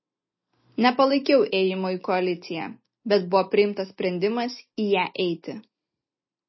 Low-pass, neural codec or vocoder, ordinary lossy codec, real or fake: 7.2 kHz; none; MP3, 24 kbps; real